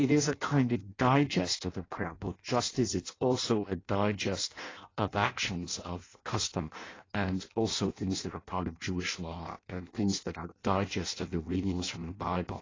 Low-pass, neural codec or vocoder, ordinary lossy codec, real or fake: 7.2 kHz; codec, 16 kHz in and 24 kHz out, 0.6 kbps, FireRedTTS-2 codec; AAC, 32 kbps; fake